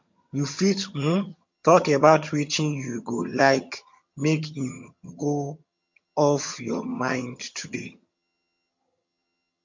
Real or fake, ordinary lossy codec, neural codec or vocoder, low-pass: fake; MP3, 48 kbps; vocoder, 22.05 kHz, 80 mel bands, HiFi-GAN; 7.2 kHz